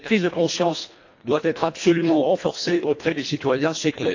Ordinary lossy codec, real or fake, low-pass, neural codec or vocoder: AAC, 48 kbps; fake; 7.2 kHz; codec, 24 kHz, 1.5 kbps, HILCodec